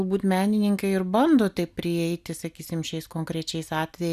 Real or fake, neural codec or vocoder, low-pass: real; none; 14.4 kHz